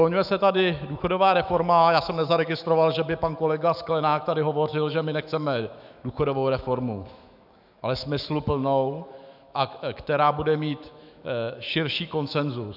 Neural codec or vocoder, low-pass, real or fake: autoencoder, 48 kHz, 128 numbers a frame, DAC-VAE, trained on Japanese speech; 5.4 kHz; fake